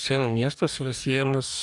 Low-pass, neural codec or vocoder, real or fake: 10.8 kHz; codec, 44.1 kHz, 2.6 kbps, DAC; fake